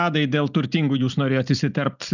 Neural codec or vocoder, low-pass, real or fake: none; 7.2 kHz; real